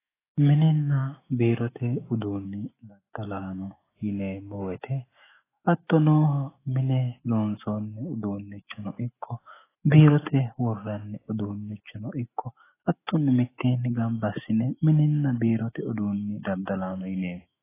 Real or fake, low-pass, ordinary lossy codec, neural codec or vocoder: fake; 3.6 kHz; AAC, 16 kbps; autoencoder, 48 kHz, 128 numbers a frame, DAC-VAE, trained on Japanese speech